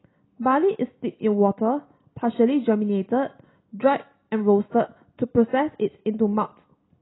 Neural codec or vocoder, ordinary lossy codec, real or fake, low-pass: none; AAC, 16 kbps; real; 7.2 kHz